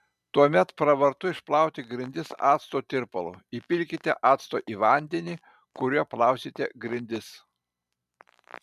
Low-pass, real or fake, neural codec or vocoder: 14.4 kHz; fake; vocoder, 44.1 kHz, 128 mel bands every 512 samples, BigVGAN v2